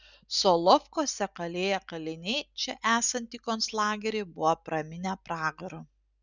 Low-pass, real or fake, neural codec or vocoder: 7.2 kHz; real; none